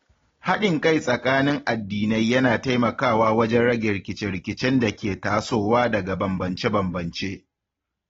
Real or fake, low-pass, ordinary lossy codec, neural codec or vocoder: real; 7.2 kHz; AAC, 24 kbps; none